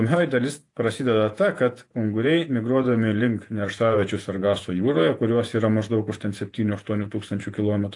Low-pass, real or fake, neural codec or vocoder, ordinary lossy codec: 10.8 kHz; fake; vocoder, 48 kHz, 128 mel bands, Vocos; AAC, 48 kbps